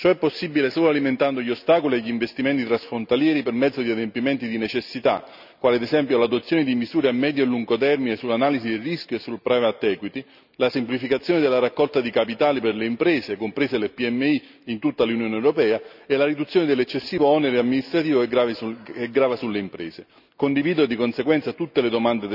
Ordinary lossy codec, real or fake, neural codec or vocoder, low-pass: none; real; none; 5.4 kHz